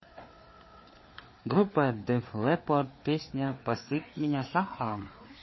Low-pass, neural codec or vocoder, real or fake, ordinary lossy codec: 7.2 kHz; codec, 16 kHz, 4 kbps, FreqCodec, larger model; fake; MP3, 24 kbps